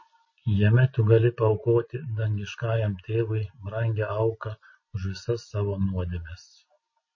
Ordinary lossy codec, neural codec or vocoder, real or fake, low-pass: MP3, 32 kbps; none; real; 7.2 kHz